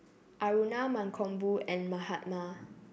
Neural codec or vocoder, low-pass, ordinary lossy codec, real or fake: none; none; none; real